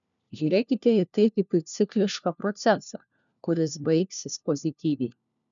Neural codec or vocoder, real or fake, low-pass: codec, 16 kHz, 1 kbps, FunCodec, trained on LibriTTS, 50 frames a second; fake; 7.2 kHz